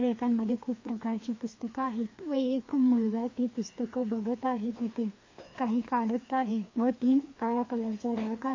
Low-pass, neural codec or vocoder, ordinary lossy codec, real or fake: 7.2 kHz; codec, 16 kHz, 2 kbps, FreqCodec, larger model; MP3, 32 kbps; fake